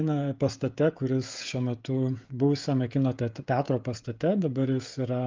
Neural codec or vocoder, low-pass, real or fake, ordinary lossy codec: codec, 16 kHz, 16 kbps, FreqCodec, smaller model; 7.2 kHz; fake; Opus, 24 kbps